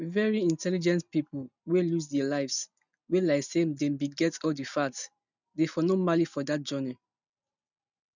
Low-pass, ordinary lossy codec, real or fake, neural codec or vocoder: 7.2 kHz; none; real; none